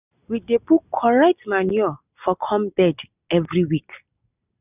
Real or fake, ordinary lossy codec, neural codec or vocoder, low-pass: real; none; none; 3.6 kHz